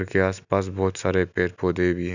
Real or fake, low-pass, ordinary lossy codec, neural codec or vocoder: real; 7.2 kHz; none; none